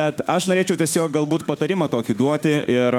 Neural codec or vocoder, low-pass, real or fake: autoencoder, 48 kHz, 32 numbers a frame, DAC-VAE, trained on Japanese speech; 19.8 kHz; fake